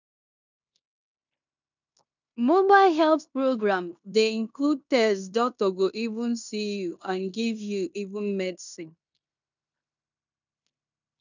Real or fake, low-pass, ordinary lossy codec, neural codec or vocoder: fake; 7.2 kHz; none; codec, 16 kHz in and 24 kHz out, 0.9 kbps, LongCat-Audio-Codec, fine tuned four codebook decoder